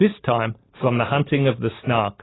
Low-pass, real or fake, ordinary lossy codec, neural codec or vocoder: 7.2 kHz; real; AAC, 16 kbps; none